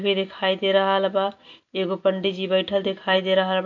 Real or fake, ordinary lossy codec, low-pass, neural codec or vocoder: real; AAC, 48 kbps; 7.2 kHz; none